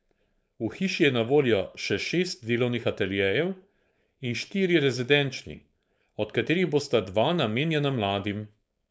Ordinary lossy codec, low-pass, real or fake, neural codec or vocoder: none; none; fake; codec, 16 kHz, 4.8 kbps, FACodec